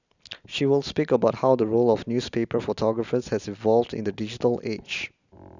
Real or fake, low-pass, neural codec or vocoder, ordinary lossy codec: real; 7.2 kHz; none; none